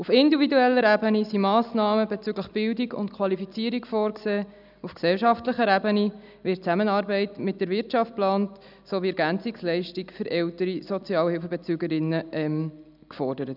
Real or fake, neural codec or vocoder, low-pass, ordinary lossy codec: real; none; 5.4 kHz; none